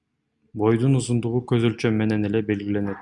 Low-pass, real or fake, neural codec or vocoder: 10.8 kHz; real; none